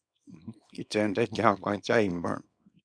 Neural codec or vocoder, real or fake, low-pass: codec, 24 kHz, 0.9 kbps, WavTokenizer, small release; fake; 9.9 kHz